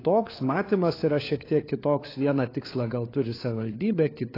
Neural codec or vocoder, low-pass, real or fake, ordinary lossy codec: codec, 16 kHz, 4 kbps, FunCodec, trained on LibriTTS, 50 frames a second; 5.4 kHz; fake; AAC, 24 kbps